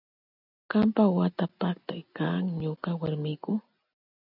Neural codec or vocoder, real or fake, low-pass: none; real; 5.4 kHz